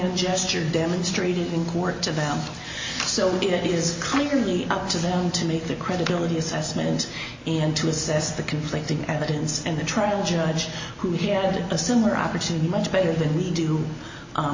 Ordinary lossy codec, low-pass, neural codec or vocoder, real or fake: MP3, 32 kbps; 7.2 kHz; none; real